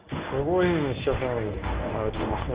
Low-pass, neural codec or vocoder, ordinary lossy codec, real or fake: 3.6 kHz; codec, 24 kHz, 0.9 kbps, WavTokenizer, medium speech release version 1; Opus, 32 kbps; fake